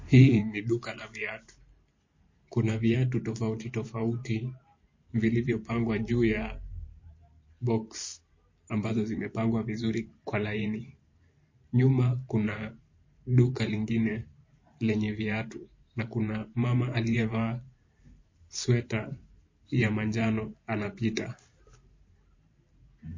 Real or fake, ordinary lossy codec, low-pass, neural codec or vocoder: fake; MP3, 32 kbps; 7.2 kHz; vocoder, 44.1 kHz, 128 mel bands every 512 samples, BigVGAN v2